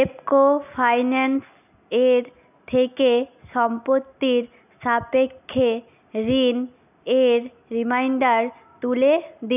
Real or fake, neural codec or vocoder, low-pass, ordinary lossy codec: real; none; 3.6 kHz; none